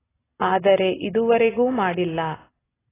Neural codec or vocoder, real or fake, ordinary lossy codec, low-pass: none; real; AAC, 16 kbps; 3.6 kHz